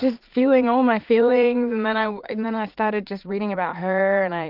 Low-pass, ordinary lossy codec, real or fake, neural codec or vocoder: 5.4 kHz; Opus, 24 kbps; fake; vocoder, 44.1 kHz, 80 mel bands, Vocos